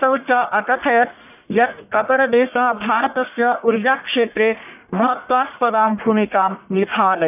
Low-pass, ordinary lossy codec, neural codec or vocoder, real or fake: 3.6 kHz; none; codec, 44.1 kHz, 1.7 kbps, Pupu-Codec; fake